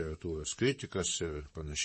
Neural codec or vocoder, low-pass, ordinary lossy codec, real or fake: vocoder, 44.1 kHz, 128 mel bands, Pupu-Vocoder; 10.8 kHz; MP3, 32 kbps; fake